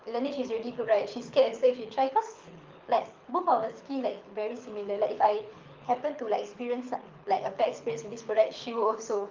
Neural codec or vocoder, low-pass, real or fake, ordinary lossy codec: codec, 24 kHz, 6 kbps, HILCodec; 7.2 kHz; fake; Opus, 32 kbps